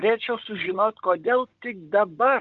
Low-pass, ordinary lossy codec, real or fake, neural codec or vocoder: 7.2 kHz; Opus, 24 kbps; fake; codec, 16 kHz, 16 kbps, FunCodec, trained on Chinese and English, 50 frames a second